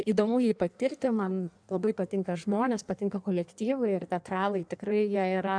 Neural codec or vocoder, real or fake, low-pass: codec, 16 kHz in and 24 kHz out, 1.1 kbps, FireRedTTS-2 codec; fake; 9.9 kHz